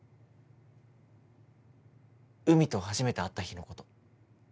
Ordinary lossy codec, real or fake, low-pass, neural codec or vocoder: none; real; none; none